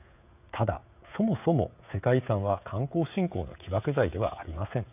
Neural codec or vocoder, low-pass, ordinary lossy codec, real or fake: codec, 16 kHz, 16 kbps, FreqCodec, smaller model; 3.6 kHz; none; fake